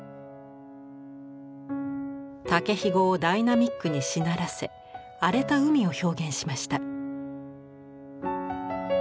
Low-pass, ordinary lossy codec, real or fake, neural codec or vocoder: none; none; real; none